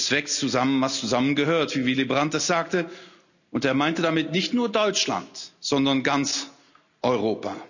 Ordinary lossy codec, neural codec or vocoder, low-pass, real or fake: none; none; 7.2 kHz; real